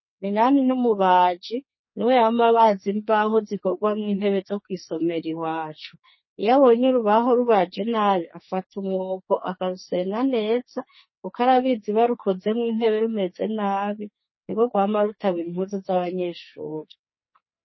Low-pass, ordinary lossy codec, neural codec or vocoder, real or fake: 7.2 kHz; MP3, 24 kbps; codec, 44.1 kHz, 2.6 kbps, SNAC; fake